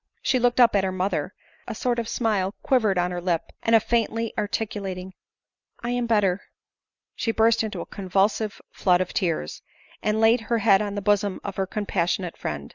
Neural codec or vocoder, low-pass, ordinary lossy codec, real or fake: none; 7.2 kHz; Opus, 64 kbps; real